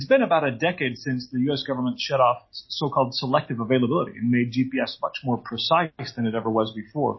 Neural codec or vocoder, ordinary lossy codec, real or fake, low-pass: none; MP3, 24 kbps; real; 7.2 kHz